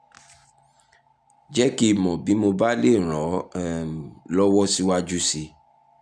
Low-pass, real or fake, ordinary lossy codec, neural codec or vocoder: 9.9 kHz; real; none; none